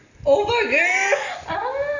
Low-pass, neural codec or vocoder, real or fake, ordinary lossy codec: 7.2 kHz; none; real; none